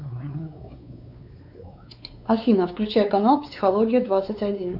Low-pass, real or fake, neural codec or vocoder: 5.4 kHz; fake; codec, 16 kHz, 4 kbps, X-Codec, WavLM features, trained on Multilingual LibriSpeech